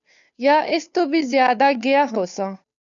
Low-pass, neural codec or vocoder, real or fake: 7.2 kHz; codec, 16 kHz, 2 kbps, FunCodec, trained on Chinese and English, 25 frames a second; fake